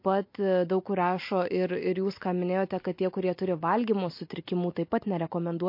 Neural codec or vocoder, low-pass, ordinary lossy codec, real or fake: none; 5.4 kHz; MP3, 32 kbps; real